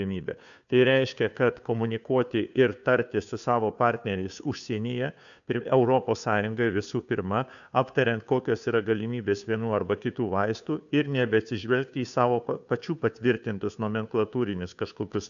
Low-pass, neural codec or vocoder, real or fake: 7.2 kHz; codec, 16 kHz, 2 kbps, FunCodec, trained on Chinese and English, 25 frames a second; fake